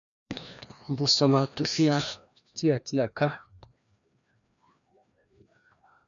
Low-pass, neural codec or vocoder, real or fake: 7.2 kHz; codec, 16 kHz, 1 kbps, FreqCodec, larger model; fake